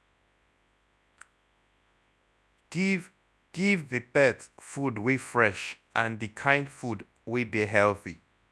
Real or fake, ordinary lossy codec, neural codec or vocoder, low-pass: fake; none; codec, 24 kHz, 0.9 kbps, WavTokenizer, large speech release; none